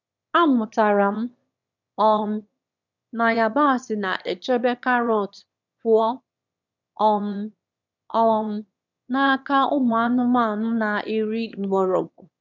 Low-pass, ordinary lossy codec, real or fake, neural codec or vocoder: 7.2 kHz; none; fake; autoencoder, 22.05 kHz, a latent of 192 numbers a frame, VITS, trained on one speaker